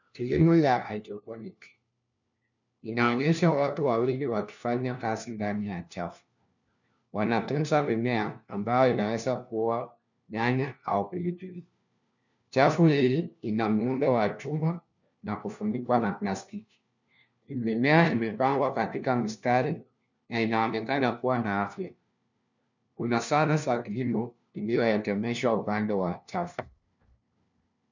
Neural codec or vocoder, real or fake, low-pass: codec, 16 kHz, 1 kbps, FunCodec, trained on LibriTTS, 50 frames a second; fake; 7.2 kHz